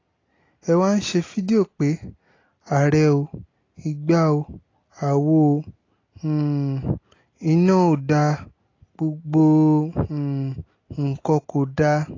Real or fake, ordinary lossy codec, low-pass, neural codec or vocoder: real; AAC, 32 kbps; 7.2 kHz; none